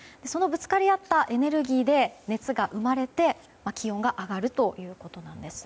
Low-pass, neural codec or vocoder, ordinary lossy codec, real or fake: none; none; none; real